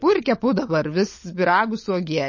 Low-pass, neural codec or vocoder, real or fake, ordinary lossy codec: 7.2 kHz; codec, 16 kHz, 16 kbps, FunCodec, trained on LibriTTS, 50 frames a second; fake; MP3, 32 kbps